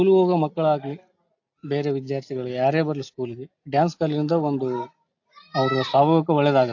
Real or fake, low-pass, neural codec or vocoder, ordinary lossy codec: real; 7.2 kHz; none; AAC, 48 kbps